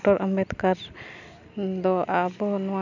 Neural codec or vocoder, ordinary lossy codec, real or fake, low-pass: none; none; real; 7.2 kHz